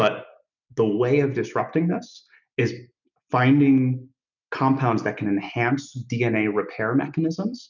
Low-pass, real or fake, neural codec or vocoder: 7.2 kHz; real; none